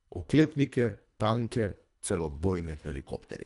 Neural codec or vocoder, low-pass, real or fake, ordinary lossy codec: codec, 24 kHz, 1.5 kbps, HILCodec; 10.8 kHz; fake; none